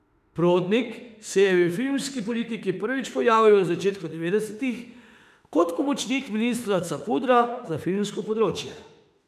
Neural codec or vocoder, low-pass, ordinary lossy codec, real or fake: autoencoder, 48 kHz, 32 numbers a frame, DAC-VAE, trained on Japanese speech; 14.4 kHz; none; fake